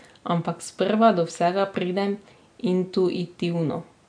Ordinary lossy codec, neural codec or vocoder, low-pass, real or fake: AAC, 64 kbps; none; 9.9 kHz; real